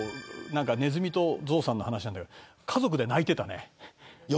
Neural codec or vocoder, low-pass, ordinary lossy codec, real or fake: none; none; none; real